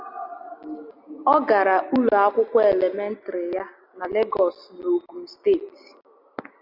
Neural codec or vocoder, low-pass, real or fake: none; 5.4 kHz; real